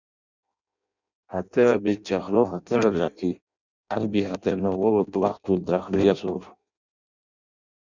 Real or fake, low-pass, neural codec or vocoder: fake; 7.2 kHz; codec, 16 kHz in and 24 kHz out, 0.6 kbps, FireRedTTS-2 codec